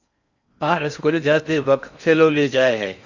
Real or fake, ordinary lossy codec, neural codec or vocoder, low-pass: fake; Opus, 64 kbps; codec, 16 kHz in and 24 kHz out, 0.6 kbps, FocalCodec, streaming, 4096 codes; 7.2 kHz